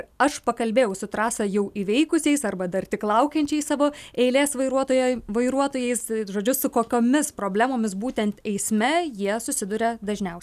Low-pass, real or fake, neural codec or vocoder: 14.4 kHz; real; none